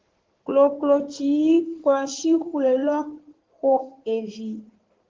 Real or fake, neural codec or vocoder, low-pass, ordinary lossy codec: fake; codec, 16 kHz in and 24 kHz out, 2.2 kbps, FireRedTTS-2 codec; 7.2 kHz; Opus, 16 kbps